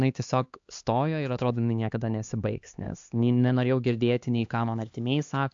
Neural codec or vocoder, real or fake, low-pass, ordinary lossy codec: codec, 16 kHz, 2 kbps, X-Codec, HuBERT features, trained on LibriSpeech; fake; 7.2 kHz; AAC, 64 kbps